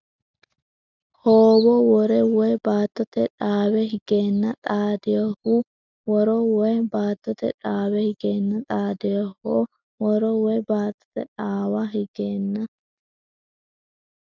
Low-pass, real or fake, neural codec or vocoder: 7.2 kHz; real; none